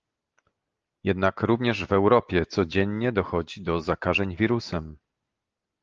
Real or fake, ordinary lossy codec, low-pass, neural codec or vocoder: real; Opus, 24 kbps; 7.2 kHz; none